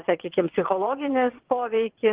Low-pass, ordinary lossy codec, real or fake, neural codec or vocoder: 3.6 kHz; Opus, 16 kbps; fake; vocoder, 22.05 kHz, 80 mel bands, WaveNeXt